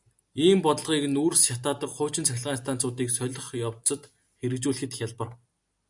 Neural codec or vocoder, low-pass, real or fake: none; 10.8 kHz; real